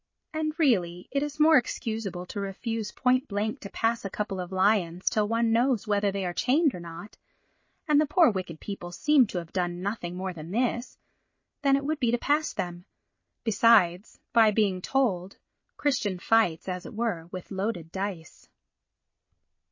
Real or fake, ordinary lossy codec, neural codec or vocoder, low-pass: real; MP3, 32 kbps; none; 7.2 kHz